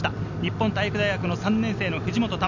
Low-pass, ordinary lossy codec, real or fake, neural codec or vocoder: 7.2 kHz; none; real; none